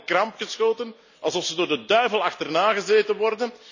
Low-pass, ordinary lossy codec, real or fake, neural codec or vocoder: 7.2 kHz; none; real; none